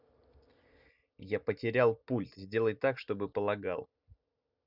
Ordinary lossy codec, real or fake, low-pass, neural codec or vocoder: none; real; 5.4 kHz; none